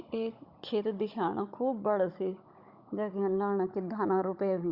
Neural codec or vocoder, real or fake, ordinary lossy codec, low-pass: codec, 16 kHz, 16 kbps, FunCodec, trained on Chinese and English, 50 frames a second; fake; Opus, 64 kbps; 5.4 kHz